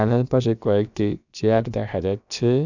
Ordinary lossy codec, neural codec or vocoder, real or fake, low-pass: none; codec, 16 kHz, about 1 kbps, DyCAST, with the encoder's durations; fake; 7.2 kHz